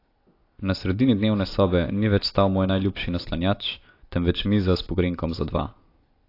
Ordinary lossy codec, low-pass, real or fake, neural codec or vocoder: AAC, 32 kbps; 5.4 kHz; real; none